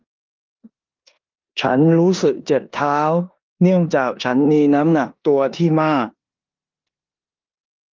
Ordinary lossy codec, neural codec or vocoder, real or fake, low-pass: Opus, 32 kbps; codec, 16 kHz in and 24 kHz out, 0.9 kbps, LongCat-Audio-Codec, four codebook decoder; fake; 7.2 kHz